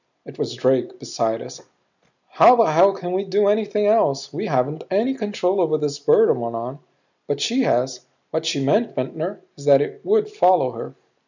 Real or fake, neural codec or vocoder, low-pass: real; none; 7.2 kHz